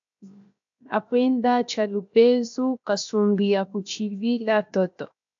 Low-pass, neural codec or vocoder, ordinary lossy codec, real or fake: 7.2 kHz; codec, 16 kHz, 0.7 kbps, FocalCodec; AAC, 64 kbps; fake